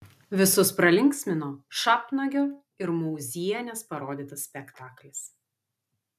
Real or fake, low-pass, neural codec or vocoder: real; 14.4 kHz; none